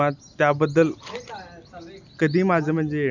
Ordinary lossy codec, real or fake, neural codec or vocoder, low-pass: none; real; none; 7.2 kHz